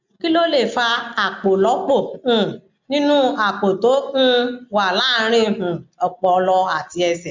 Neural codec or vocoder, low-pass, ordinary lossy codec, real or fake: none; 7.2 kHz; MP3, 64 kbps; real